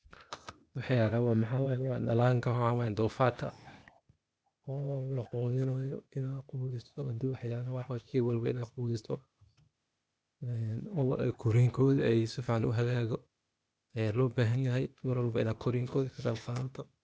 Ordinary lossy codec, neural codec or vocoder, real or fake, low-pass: none; codec, 16 kHz, 0.8 kbps, ZipCodec; fake; none